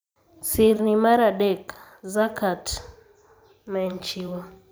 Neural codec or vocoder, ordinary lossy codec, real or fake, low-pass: vocoder, 44.1 kHz, 128 mel bands every 512 samples, BigVGAN v2; none; fake; none